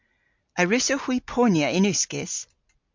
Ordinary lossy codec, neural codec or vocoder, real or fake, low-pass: MP3, 64 kbps; none; real; 7.2 kHz